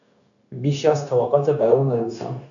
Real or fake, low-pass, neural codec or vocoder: fake; 7.2 kHz; codec, 16 kHz, 0.9 kbps, LongCat-Audio-Codec